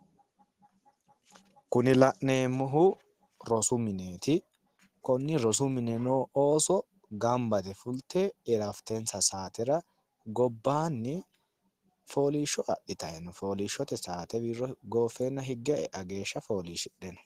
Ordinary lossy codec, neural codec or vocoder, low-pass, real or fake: Opus, 16 kbps; none; 14.4 kHz; real